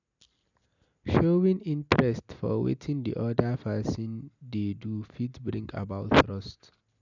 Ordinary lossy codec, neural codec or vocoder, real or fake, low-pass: none; none; real; 7.2 kHz